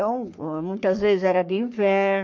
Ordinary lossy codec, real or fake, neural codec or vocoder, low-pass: MP3, 64 kbps; fake; codec, 44.1 kHz, 3.4 kbps, Pupu-Codec; 7.2 kHz